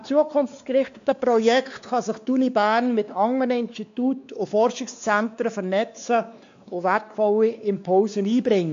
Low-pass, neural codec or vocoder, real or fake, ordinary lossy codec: 7.2 kHz; codec, 16 kHz, 2 kbps, X-Codec, WavLM features, trained on Multilingual LibriSpeech; fake; AAC, 64 kbps